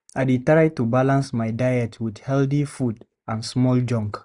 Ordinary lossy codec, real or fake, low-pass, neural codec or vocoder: none; real; 10.8 kHz; none